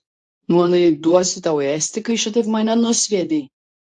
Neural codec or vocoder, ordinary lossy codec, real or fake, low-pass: codec, 24 kHz, 0.9 kbps, WavTokenizer, medium speech release version 2; AAC, 64 kbps; fake; 10.8 kHz